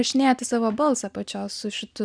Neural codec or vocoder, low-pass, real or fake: none; 9.9 kHz; real